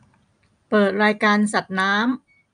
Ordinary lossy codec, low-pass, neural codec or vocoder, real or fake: none; 9.9 kHz; none; real